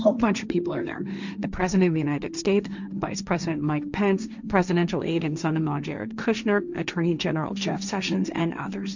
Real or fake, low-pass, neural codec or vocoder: fake; 7.2 kHz; codec, 16 kHz, 1.1 kbps, Voila-Tokenizer